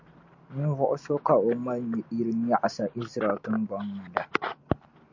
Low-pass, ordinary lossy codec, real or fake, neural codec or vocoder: 7.2 kHz; MP3, 48 kbps; real; none